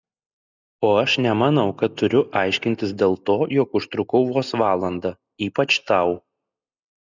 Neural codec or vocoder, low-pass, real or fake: none; 7.2 kHz; real